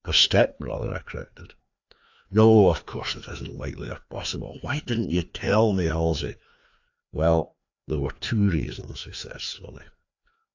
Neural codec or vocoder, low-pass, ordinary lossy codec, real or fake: codec, 16 kHz, 2 kbps, FreqCodec, larger model; 7.2 kHz; AAC, 48 kbps; fake